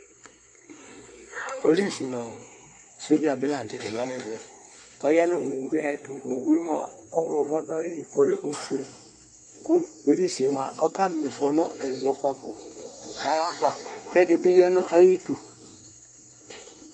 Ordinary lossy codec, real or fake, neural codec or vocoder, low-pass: MP3, 48 kbps; fake; codec, 24 kHz, 1 kbps, SNAC; 9.9 kHz